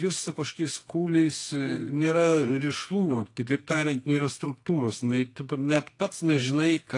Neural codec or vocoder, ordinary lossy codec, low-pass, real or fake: codec, 24 kHz, 0.9 kbps, WavTokenizer, medium music audio release; AAC, 48 kbps; 10.8 kHz; fake